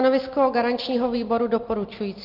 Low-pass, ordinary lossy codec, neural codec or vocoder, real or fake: 5.4 kHz; Opus, 32 kbps; none; real